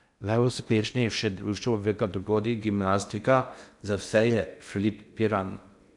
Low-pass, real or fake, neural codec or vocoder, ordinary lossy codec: 10.8 kHz; fake; codec, 16 kHz in and 24 kHz out, 0.6 kbps, FocalCodec, streaming, 2048 codes; none